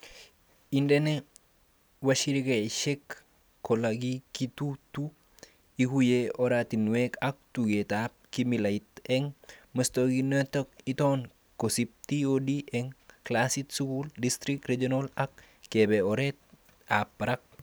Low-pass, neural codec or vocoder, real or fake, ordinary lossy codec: none; none; real; none